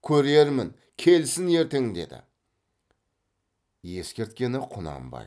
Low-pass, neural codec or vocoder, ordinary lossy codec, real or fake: none; none; none; real